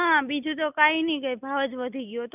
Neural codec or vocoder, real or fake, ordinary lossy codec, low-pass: none; real; none; 3.6 kHz